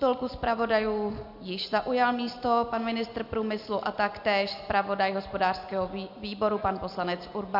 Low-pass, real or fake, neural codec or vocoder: 5.4 kHz; real; none